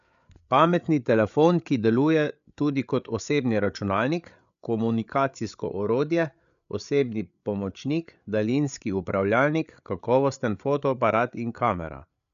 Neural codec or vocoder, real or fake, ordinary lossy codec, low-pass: codec, 16 kHz, 8 kbps, FreqCodec, larger model; fake; MP3, 96 kbps; 7.2 kHz